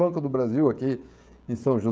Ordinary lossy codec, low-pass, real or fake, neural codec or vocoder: none; none; fake; codec, 16 kHz, 16 kbps, FreqCodec, smaller model